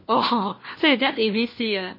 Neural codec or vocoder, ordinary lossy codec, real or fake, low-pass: codec, 16 kHz, 1 kbps, FunCodec, trained on LibriTTS, 50 frames a second; MP3, 24 kbps; fake; 5.4 kHz